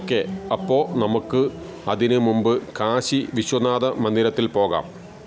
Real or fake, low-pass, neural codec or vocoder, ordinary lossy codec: real; none; none; none